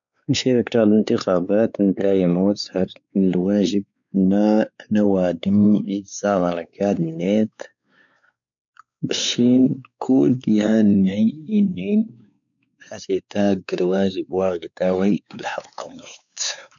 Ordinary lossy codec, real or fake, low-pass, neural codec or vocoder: none; fake; 7.2 kHz; codec, 16 kHz, 4 kbps, X-Codec, WavLM features, trained on Multilingual LibriSpeech